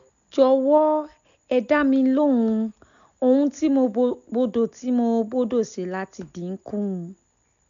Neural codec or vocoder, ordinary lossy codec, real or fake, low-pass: none; none; real; 7.2 kHz